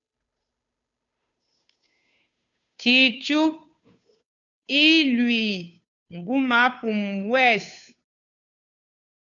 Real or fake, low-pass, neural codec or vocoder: fake; 7.2 kHz; codec, 16 kHz, 2 kbps, FunCodec, trained on Chinese and English, 25 frames a second